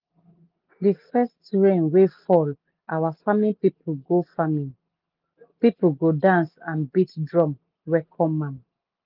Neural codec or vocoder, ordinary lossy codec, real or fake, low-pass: none; Opus, 24 kbps; real; 5.4 kHz